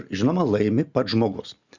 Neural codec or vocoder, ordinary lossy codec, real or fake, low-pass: none; Opus, 64 kbps; real; 7.2 kHz